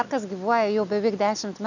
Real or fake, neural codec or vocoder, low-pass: real; none; 7.2 kHz